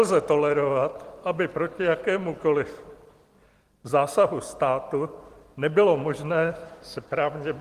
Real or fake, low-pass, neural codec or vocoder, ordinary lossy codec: fake; 14.4 kHz; vocoder, 44.1 kHz, 128 mel bands every 256 samples, BigVGAN v2; Opus, 24 kbps